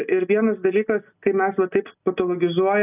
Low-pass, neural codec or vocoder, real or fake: 3.6 kHz; none; real